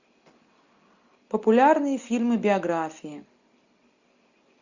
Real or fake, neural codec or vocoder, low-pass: real; none; 7.2 kHz